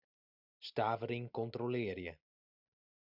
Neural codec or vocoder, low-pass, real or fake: none; 5.4 kHz; real